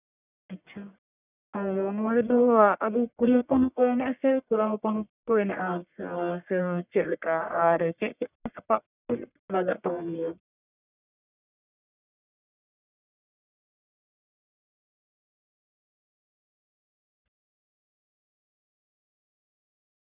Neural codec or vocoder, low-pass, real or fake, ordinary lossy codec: codec, 44.1 kHz, 1.7 kbps, Pupu-Codec; 3.6 kHz; fake; none